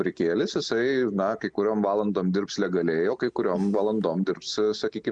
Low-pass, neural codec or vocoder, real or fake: 9.9 kHz; none; real